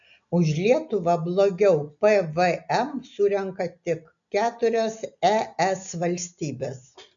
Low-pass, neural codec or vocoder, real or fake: 7.2 kHz; none; real